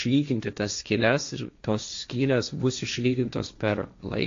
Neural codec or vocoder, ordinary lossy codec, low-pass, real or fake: codec, 16 kHz, 1.1 kbps, Voila-Tokenizer; AAC, 48 kbps; 7.2 kHz; fake